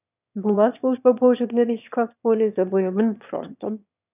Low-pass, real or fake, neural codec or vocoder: 3.6 kHz; fake; autoencoder, 22.05 kHz, a latent of 192 numbers a frame, VITS, trained on one speaker